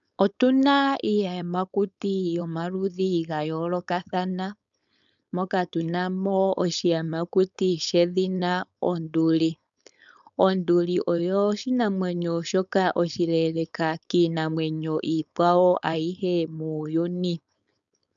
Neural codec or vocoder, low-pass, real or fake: codec, 16 kHz, 4.8 kbps, FACodec; 7.2 kHz; fake